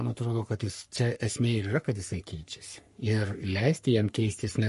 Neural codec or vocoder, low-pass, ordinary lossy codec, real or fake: codec, 44.1 kHz, 3.4 kbps, Pupu-Codec; 14.4 kHz; MP3, 48 kbps; fake